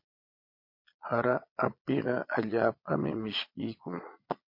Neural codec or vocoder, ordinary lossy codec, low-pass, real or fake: vocoder, 22.05 kHz, 80 mel bands, Vocos; MP3, 32 kbps; 5.4 kHz; fake